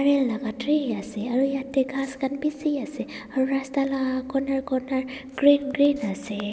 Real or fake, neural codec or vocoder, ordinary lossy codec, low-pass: real; none; none; none